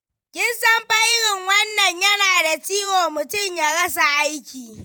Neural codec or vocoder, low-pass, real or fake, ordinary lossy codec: vocoder, 48 kHz, 128 mel bands, Vocos; none; fake; none